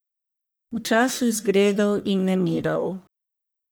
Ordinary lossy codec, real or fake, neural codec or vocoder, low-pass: none; fake; codec, 44.1 kHz, 1.7 kbps, Pupu-Codec; none